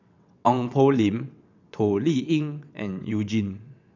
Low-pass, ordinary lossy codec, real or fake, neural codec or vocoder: 7.2 kHz; none; fake; vocoder, 22.05 kHz, 80 mel bands, WaveNeXt